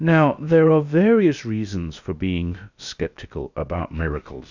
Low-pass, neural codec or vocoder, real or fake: 7.2 kHz; codec, 16 kHz, about 1 kbps, DyCAST, with the encoder's durations; fake